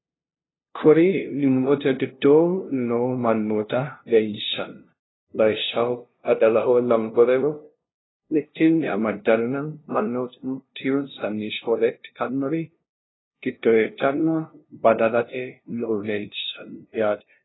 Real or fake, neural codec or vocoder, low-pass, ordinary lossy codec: fake; codec, 16 kHz, 0.5 kbps, FunCodec, trained on LibriTTS, 25 frames a second; 7.2 kHz; AAC, 16 kbps